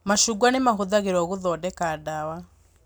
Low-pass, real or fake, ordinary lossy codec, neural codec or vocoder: none; real; none; none